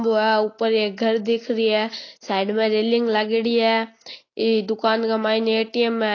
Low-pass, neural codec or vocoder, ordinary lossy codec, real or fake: 7.2 kHz; none; AAC, 32 kbps; real